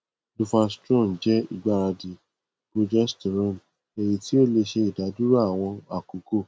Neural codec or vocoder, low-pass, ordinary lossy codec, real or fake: none; none; none; real